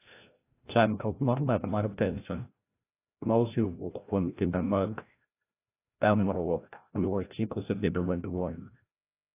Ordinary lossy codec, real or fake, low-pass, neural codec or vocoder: AAC, 24 kbps; fake; 3.6 kHz; codec, 16 kHz, 0.5 kbps, FreqCodec, larger model